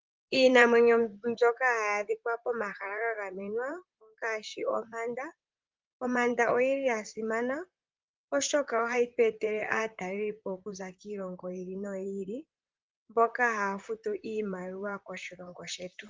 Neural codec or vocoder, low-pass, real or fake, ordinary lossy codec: vocoder, 44.1 kHz, 128 mel bands, Pupu-Vocoder; 7.2 kHz; fake; Opus, 24 kbps